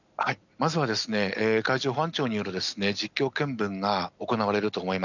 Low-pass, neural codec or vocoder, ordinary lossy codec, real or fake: 7.2 kHz; none; none; real